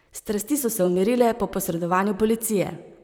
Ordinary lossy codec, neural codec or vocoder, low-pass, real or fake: none; vocoder, 44.1 kHz, 128 mel bands, Pupu-Vocoder; none; fake